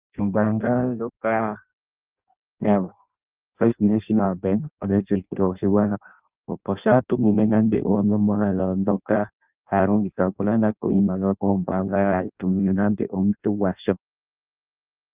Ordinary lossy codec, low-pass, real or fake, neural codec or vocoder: Opus, 24 kbps; 3.6 kHz; fake; codec, 16 kHz in and 24 kHz out, 0.6 kbps, FireRedTTS-2 codec